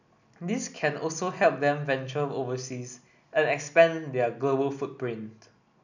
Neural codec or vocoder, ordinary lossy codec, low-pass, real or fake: none; none; 7.2 kHz; real